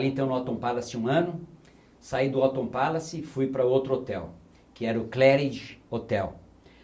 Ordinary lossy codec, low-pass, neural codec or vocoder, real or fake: none; none; none; real